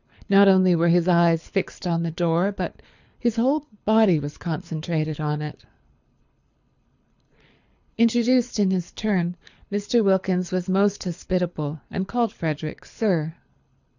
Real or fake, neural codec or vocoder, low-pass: fake; codec, 24 kHz, 6 kbps, HILCodec; 7.2 kHz